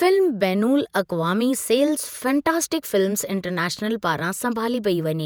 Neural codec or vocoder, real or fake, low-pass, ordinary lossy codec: vocoder, 48 kHz, 128 mel bands, Vocos; fake; none; none